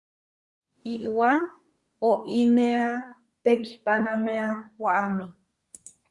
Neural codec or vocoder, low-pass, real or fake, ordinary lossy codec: codec, 24 kHz, 1 kbps, SNAC; 10.8 kHz; fake; Opus, 64 kbps